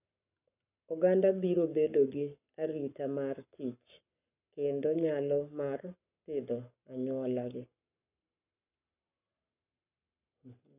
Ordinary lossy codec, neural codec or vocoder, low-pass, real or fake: none; codec, 44.1 kHz, 7.8 kbps, Pupu-Codec; 3.6 kHz; fake